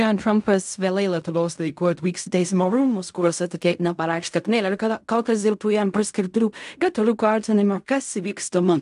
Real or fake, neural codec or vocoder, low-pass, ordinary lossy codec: fake; codec, 16 kHz in and 24 kHz out, 0.4 kbps, LongCat-Audio-Codec, fine tuned four codebook decoder; 10.8 kHz; AAC, 96 kbps